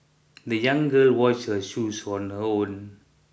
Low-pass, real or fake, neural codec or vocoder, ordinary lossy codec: none; real; none; none